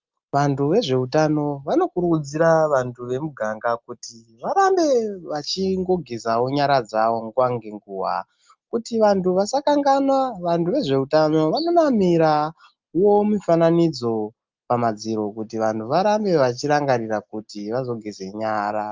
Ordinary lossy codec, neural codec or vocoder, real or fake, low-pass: Opus, 32 kbps; none; real; 7.2 kHz